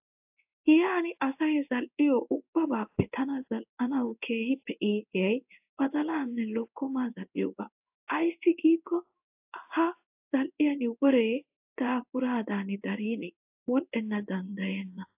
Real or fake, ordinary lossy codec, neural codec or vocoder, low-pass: fake; AAC, 32 kbps; codec, 16 kHz in and 24 kHz out, 1 kbps, XY-Tokenizer; 3.6 kHz